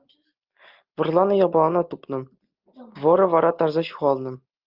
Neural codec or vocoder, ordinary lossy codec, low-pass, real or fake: none; Opus, 32 kbps; 5.4 kHz; real